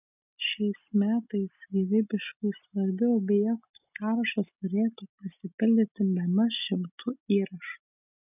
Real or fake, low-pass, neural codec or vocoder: real; 3.6 kHz; none